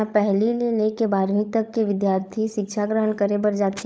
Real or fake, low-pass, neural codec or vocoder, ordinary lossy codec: fake; none; codec, 16 kHz, 16 kbps, FunCodec, trained on LibriTTS, 50 frames a second; none